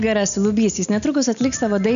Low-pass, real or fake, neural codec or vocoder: 7.2 kHz; real; none